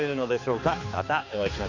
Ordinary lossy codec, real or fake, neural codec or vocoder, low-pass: MP3, 48 kbps; fake; codec, 16 kHz, 2 kbps, X-Codec, HuBERT features, trained on balanced general audio; 7.2 kHz